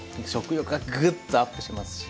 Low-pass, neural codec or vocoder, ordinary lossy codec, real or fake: none; none; none; real